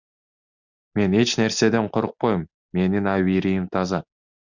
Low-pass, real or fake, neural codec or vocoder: 7.2 kHz; real; none